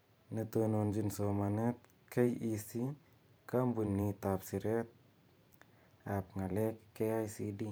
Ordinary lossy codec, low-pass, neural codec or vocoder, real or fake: none; none; vocoder, 44.1 kHz, 128 mel bands every 512 samples, BigVGAN v2; fake